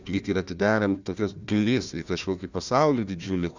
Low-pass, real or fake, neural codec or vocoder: 7.2 kHz; fake; codec, 16 kHz, 1 kbps, FunCodec, trained on Chinese and English, 50 frames a second